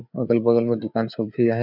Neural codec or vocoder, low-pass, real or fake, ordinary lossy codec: codec, 16 kHz, 4 kbps, FreqCodec, larger model; 5.4 kHz; fake; none